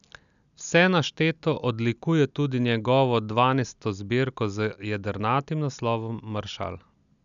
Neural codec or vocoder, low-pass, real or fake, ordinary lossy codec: none; 7.2 kHz; real; none